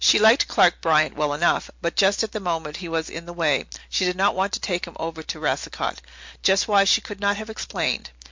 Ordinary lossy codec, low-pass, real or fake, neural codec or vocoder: MP3, 48 kbps; 7.2 kHz; real; none